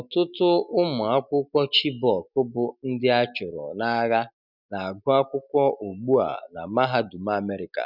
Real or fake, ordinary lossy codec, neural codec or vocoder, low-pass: real; none; none; 5.4 kHz